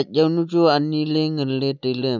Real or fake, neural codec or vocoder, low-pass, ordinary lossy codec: real; none; 7.2 kHz; none